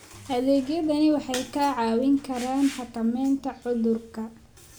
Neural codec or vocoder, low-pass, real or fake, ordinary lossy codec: none; none; real; none